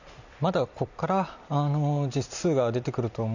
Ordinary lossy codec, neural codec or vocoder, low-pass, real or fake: none; vocoder, 44.1 kHz, 128 mel bands every 256 samples, BigVGAN v2; 7.2 kHz; fake